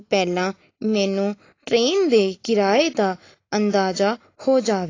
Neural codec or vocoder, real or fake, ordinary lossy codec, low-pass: none; real; AAC, 32 kbps; 7.2 kHz